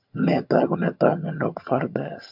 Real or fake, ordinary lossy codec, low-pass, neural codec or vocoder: fake; MP3, 48 kbps; 5.4 kHz; vocoder, 22.05 kHz, 80 mel bands, HiFi-GAN